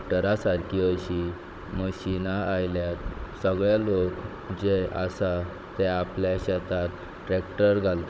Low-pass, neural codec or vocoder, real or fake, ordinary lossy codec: none; codec, 16 kHz, 16 kbps, FunCodec, trained on Chinese and English, 50 frames a second; fake; none